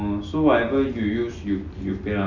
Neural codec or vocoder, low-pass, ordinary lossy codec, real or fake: none; 7.2 kHz; none; real